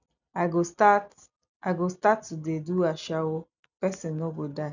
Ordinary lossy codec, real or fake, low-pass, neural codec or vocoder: none; real; 7.2 kHz; none